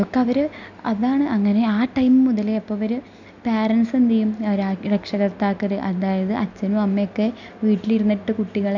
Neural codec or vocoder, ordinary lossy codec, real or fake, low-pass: none; none; real; 7.2 kHz